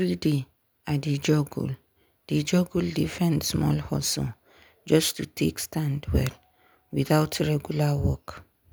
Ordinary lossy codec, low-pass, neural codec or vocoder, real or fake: none; none; none; real